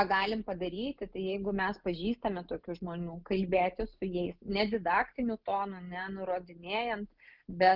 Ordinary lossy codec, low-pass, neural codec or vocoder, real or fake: Opus, 32 kbps; 5.4 kHz; none; real